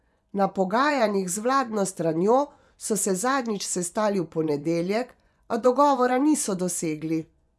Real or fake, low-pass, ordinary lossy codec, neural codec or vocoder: fake; none; none; vocoder, 24 kHz, 100 mel bands, Vocos